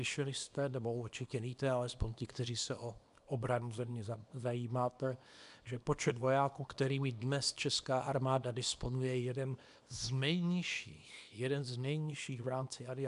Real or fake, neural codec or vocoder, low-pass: fake; codec, 24 kHz, 0.9 kbps, WavTokenizer, small release; 10.8 kHz